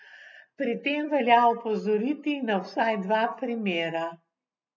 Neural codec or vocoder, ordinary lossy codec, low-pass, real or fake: none; none; 7.2 kHz; real